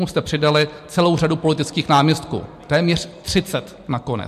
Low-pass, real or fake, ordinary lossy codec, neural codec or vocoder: 14.4 kHz; real; MP3, 64 kbps; none